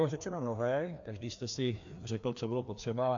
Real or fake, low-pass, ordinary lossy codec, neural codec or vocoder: fake; 7.2 kHz; AAC, 64 kbps; codec, 16 kHz, 2 kbps, FreqCodec, larger model